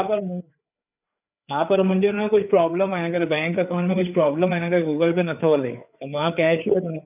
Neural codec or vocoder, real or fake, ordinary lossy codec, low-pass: codec, 16 kHz, 4 kbps, FreqCodec, larger model; fake; none; 3.6 kHz